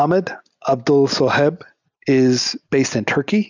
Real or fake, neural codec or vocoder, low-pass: real; none; 7.2 kHz